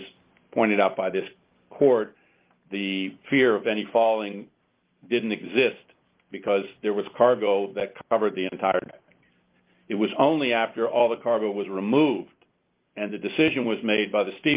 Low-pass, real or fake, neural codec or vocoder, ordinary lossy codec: 3.6 kHz; real; none; Opus, 32 kbps